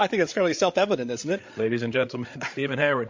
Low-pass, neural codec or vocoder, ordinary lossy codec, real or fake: 7.2 kHz; codec, 16 kHz, 8 kbps, FreqCodec, larger model; MP3, 48 kbps; fake